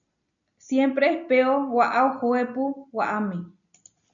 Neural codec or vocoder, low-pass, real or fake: none; 7.2 kHz; real